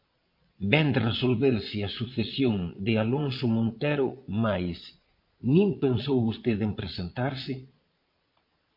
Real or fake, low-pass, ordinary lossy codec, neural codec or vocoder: fake; 5.4 kHz; MP3, 32 kbps; vocoder, 22.05 kHz, 80 mel bands, Vocos